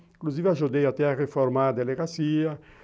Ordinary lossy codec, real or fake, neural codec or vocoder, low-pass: none; real; none; none